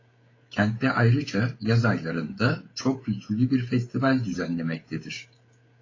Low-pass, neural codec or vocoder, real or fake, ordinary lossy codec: 7.2 kHz; codec, 16 kHz, 16 kbps, FreqCodec, smaller model; fake; AAC, 32 kbps